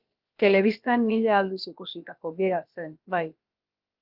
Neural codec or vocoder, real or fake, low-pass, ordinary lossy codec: codec, 16 kHz, about 1 kbps, DyCAST, with the encoder's durations; fake; 5.4 kHz; Opus, 32 kbps